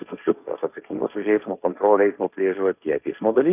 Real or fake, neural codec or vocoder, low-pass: fake; codec, 16 kHz, 1.1 kbps, Voila-Tokenizer; 3.6 kHz